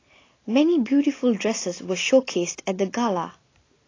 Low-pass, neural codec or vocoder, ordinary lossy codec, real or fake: 7.2 kHz; none; AAC, 32 kbps; real